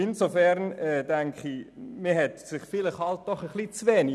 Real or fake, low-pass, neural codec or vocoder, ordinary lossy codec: real; none; none; none